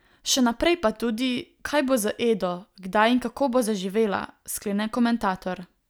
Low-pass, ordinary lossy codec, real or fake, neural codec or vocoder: none; none; real; none